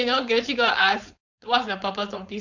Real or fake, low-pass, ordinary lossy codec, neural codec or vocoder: fake; 7.2 kHz; none; codec, 16 kHz, 4.8 kbps, FACodec